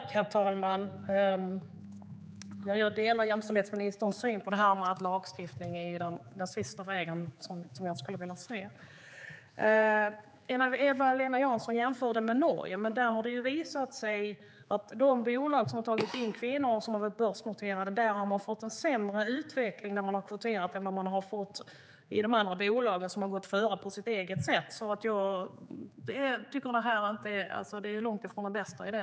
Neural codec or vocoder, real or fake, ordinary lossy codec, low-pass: codec, 16 kHz, 4 kbps, X-Codec, HuBERT features, trained on general audio; fake; none; none